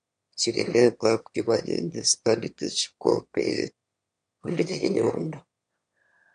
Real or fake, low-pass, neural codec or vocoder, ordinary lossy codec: fake; 9.9 kHz; autoencoder, 22.05 kHz, a latent of 192 numbers a frame, VITS, trained on one speaker; MP3, 64 kbps